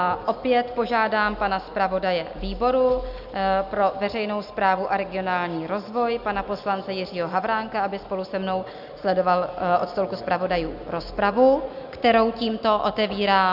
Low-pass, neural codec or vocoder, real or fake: 5.4 kHz; none; real